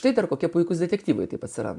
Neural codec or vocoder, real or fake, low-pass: none; real; 10.8 kHz